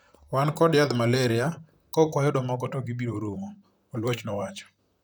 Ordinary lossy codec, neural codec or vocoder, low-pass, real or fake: none; vocoder, 44.1 kHz, 128 mel bands, Pupu-Vocoder; none; fake